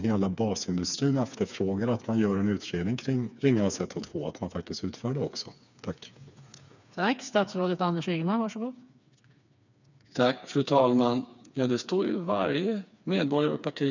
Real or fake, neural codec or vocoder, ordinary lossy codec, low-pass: fake; codec, 16 kHz, 4 kbps, FreqCodec, smaller model; none; 7.2 kHz